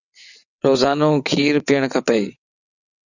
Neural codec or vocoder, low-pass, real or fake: vocoder, 22.05 kHz, 80 mel bands, WaveNeXt; 7.2 kHz; fake